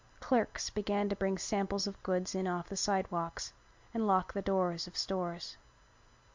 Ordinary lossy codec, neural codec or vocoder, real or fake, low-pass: MP3, 64 kbps; none; real; 7.2 kHz